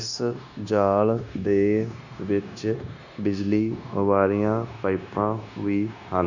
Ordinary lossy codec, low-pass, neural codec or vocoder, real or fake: none; 7.2 kHz; codec, 16 kHz, 0.9 kbps, LongCat-Audio-Codec; fake